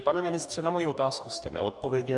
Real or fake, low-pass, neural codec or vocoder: fake; 10.8 kHz; codec, 44.1 kHz, 2.6 kbps, DAC